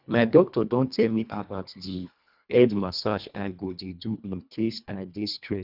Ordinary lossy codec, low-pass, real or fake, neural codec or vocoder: none; 5.4 kHz; fake; codec, 24 kHz, 1.5 kbps, HILCodec